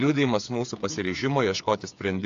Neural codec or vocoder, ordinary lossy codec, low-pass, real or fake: codec, 16 kHz, 8 kbps, FreqCodec, smaller model; AAC, 64 kbps; 7.2 kHz; fake